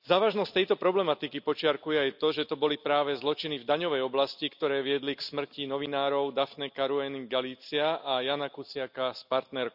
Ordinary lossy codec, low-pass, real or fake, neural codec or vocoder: none; 5.4 kHz; real; none